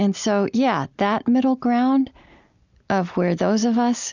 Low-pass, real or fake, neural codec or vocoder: 7.2 kHz; real; none